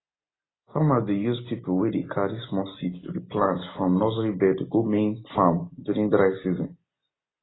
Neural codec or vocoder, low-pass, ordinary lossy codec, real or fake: none; 7.2 kHz; AAC, 16 kbps; real